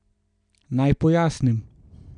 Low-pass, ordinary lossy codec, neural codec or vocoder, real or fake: 9.9 kHz; none; none; real